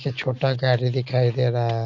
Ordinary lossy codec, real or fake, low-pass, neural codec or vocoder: none; real; 7.2 kHz; none